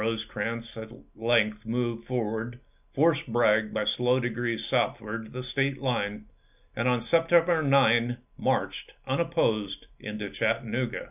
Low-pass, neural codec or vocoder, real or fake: 3.6 kHz; none; real